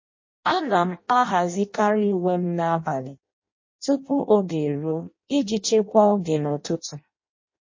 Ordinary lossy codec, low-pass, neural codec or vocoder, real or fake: MP3, 32 kbps; 7.2 kHz; codec, 16 kHz in and 24 kHz out, 0.6 kbps, FireRedTTS-2 codec; fake